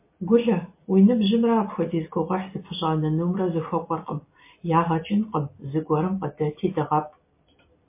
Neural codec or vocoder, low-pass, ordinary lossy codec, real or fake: none; 3.6 kHz; MP3, 24 kbps; real